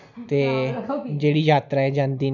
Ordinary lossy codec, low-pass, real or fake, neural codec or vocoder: none; 7.2 kHz; real; none